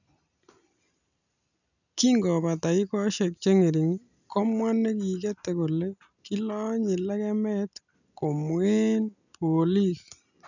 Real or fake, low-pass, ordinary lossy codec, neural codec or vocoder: real; 7.2 kHz; none; none